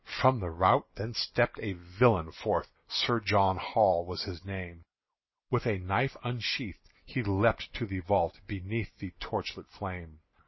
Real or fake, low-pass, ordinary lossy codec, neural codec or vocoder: real; 7.2 kHz; MP3, 24 kbps; none